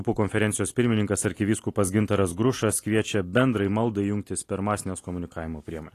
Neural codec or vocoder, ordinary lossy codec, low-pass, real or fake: none; AAC, 48 kbps; 14.4 kHz; real